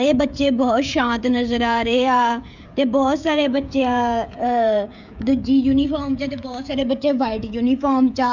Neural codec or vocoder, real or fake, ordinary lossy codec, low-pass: codec, 16 kHz, 16 kbps, FreqCodec, smaller model; fake; none; 7.2 kHz